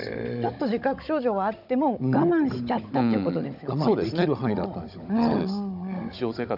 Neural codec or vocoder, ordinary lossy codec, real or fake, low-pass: codec, 16 kHz, 16 kbps, FunCodec, trained on Chinese and English, 50 frames a second; none; fake; 5.4 kHz